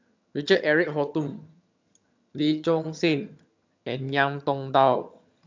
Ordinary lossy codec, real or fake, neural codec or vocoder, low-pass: MP3, 64 kbps; fake; vocoder, 22.05 kHz, 80 mel bands, HiFi-GAN; 7.2 kHz